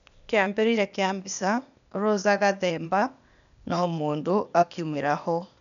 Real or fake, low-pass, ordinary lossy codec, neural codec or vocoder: fake; 7.2 kHz; none; codec, 16 kHz, 0.8 kbps, ZipCodec